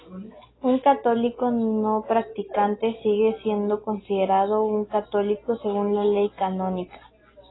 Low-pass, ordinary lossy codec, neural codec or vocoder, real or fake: 7.2 kHz; AAC, 16 kbps; none; real